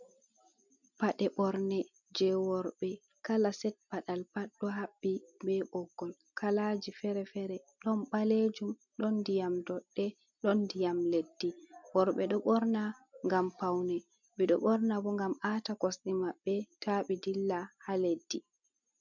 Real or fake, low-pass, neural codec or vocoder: real; 7.2 kHz; none